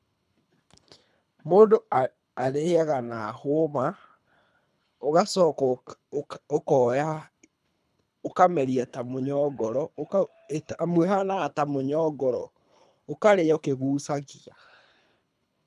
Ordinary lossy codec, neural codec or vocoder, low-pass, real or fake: none; codec, 24 kHz, 3 kbps, HILCodec; none; fake